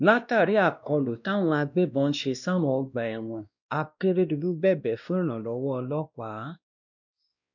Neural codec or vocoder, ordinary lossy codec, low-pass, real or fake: codec, 16 kHz, 1 kbps, X-Codec, WavLM features, trained on Multilingual LibriSpeech; none; 7.2 kHz; fake